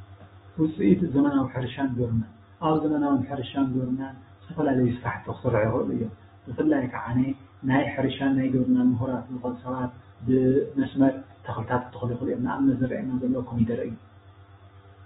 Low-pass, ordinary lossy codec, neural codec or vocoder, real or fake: 19.8 kHz; AAC, 16 kbps; autoencoder, 48 kHz, 128 numbers a frame, DAC-VAE, trained on Japanese speech; fake